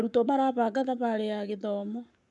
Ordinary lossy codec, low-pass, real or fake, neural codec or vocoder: none; 10.8 kHz; fake; codec, 44.1 kHz, 7.8 kbps, Pupu-Codec